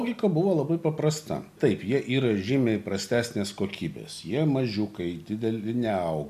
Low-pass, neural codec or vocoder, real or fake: 14.4 kHz; none; real